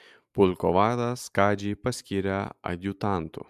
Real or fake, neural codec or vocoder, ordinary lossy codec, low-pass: real; none; MP3, 96 kbps; 14.4 kHz